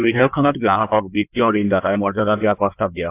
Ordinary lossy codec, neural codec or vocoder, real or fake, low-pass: AAC, 24 kbps; codec, 16 kHz in and 24 kHz out, 1.1 kbps, FireRedTTS-2 codec; fake; 3.6 kHz